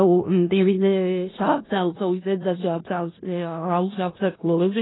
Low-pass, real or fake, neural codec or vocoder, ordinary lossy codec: 7.2 kHz; fake; codec, 16 kHz in and 24 kHz out, 0.4 kbps, LongCat-Audio-Codec, four codebook decoder; AAC, 16 kbps